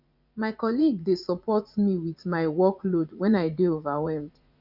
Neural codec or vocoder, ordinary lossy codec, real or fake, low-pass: vocoder, 44.1 kHz, 80 mel bands, Vocos; none; fake; 5.4 kHz